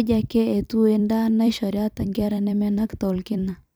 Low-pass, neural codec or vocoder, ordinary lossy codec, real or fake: none; vocoder, 44.1 kHz, 128 mel bands every 256 samples, BigVGAN v2; none; fake